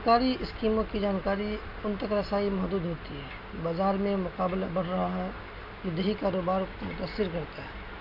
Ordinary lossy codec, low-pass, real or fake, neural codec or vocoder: none; 5.4 kHz; real; none